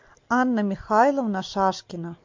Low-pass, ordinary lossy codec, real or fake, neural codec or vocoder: 7.2 kHz; MP3, 48 kbps; real; none